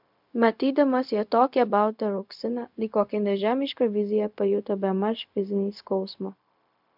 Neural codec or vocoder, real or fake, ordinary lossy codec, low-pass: codec, 16 kHz, 0.4 kbps, LongCat-Audio-Codec; fake; MP3, 48 kbps; 5.4 kHz